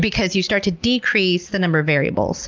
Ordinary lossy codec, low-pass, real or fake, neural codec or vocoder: Opus, 32 kbps; 7.2 kHz; real; none